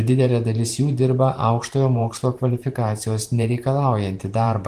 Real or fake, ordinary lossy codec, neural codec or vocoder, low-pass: fake; Opus, 24 kbps; vocoder, 44.1 kHz, 128 mel bands every 512 samples, BigVGAN v2; 14.4 kHz